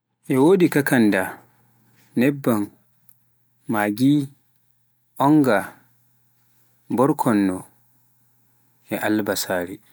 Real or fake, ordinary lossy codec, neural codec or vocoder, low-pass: real; none; none; none